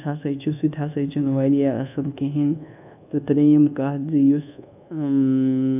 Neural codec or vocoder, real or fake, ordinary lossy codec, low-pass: codec, 24 kHz, 1.2 kbps, DualCodec; fake; none; 3.6 kHz